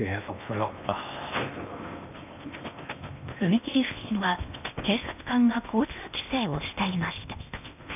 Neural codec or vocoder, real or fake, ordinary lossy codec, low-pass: codec, 16 kHz in and 24 kHz out, 0.8 kbps, FocalCodec, streaming, 65536 codes; fake; none; 3.6 kHz